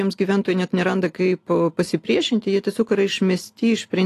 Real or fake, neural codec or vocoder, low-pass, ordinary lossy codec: real; none; 14.4 kHz; AAC, 48 kbps